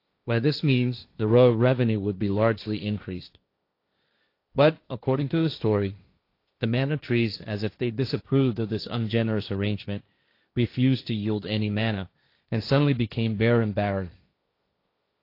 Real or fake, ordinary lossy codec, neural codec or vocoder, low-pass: fake; AAC, 32 kbps; codec, 16 kHz, 1.1 kbps, Voila-Tokenizer; 5.4 kHz